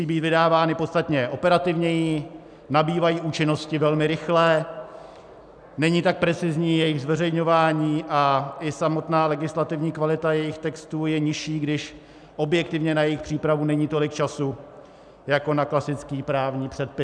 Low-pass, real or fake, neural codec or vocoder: 9.9 kHz; real; none